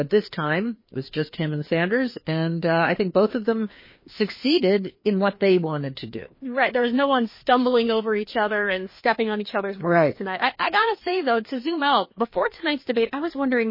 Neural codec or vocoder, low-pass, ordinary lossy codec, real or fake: codec, 16 kHz, 2 kbps, FreqCodec, larger model; 5.4 kHz; MP3, 24 kbps; fake